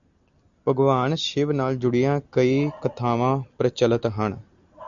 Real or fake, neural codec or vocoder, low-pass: real; none; 7.2 kHz